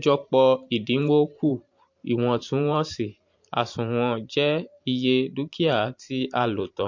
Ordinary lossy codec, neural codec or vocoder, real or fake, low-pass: MP3, 48 kbps; vocoder, 44.1 kHz, 128 mel bands every 512 samples, BigVGAN v2; fake; 7.2 kHz